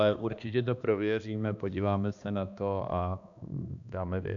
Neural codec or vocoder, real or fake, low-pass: codec, 16 kHz, 2 kbps, X-Codec, HuBERT features, trained on balanced general audio; fake; 7.2 kHz